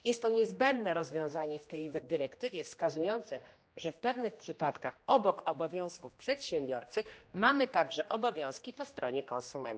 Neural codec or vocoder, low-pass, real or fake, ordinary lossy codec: codec, 16 kHz, 1 kbps, X-Codec, HuBERT features, trained on general audio; none; fake; none